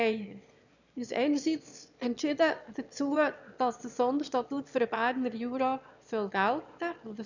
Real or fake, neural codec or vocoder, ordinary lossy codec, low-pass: fake; autoencoder, 22.05 kHz, a latent of 192 numbers a frame, VITS, trained on one speaker; none; 7.2 kHz